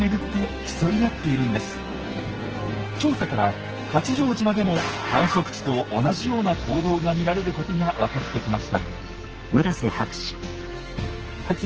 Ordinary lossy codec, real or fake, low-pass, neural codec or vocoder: Opus, 16 kbps; fake; 7.2 kHz; codec, 44.1 kHz, 2.6 kbps, SNAC